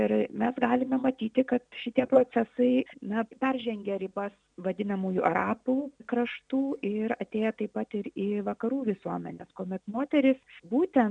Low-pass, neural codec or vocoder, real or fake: 9.9 kHz; none; real